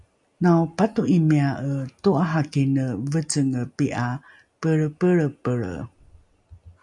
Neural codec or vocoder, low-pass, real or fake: none; 10.8 kHz; real